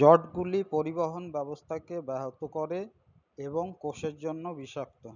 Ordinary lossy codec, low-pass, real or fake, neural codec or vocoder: none; 7.2 kHz; real; none